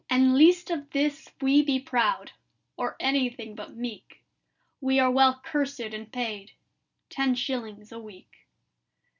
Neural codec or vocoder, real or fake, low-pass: none; real; 7.2 kHz